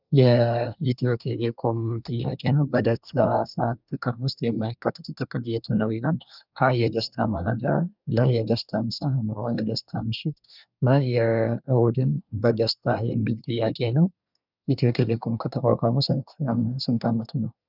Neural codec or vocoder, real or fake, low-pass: codec, 24 kHz, 1 kbps, SNAC; fake; 5.4 kHz